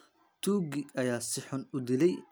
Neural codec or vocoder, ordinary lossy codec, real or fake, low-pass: none; none; real; none